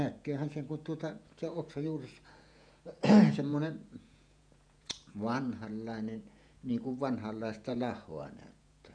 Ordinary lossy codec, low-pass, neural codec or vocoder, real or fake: none; 9.9 kHz; vocoder, 48 kHz, 128 mel bands, Vocos; fake